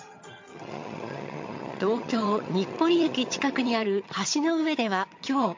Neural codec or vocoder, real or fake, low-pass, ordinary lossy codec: vocoder, 22.05 kHz, 80 mel bands, HiFi-GAN; fake; 7.2 kHz; MP3, 48 kbps